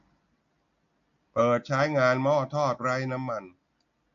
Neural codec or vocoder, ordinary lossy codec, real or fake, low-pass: none; AAC, 48 kbps; real; 7.2 kHz